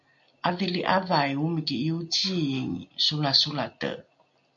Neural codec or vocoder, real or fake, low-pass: none; real; 7.2 kHz